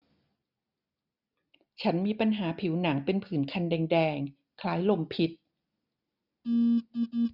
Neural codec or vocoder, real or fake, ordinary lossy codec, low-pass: none; real; none; 5.4 kHz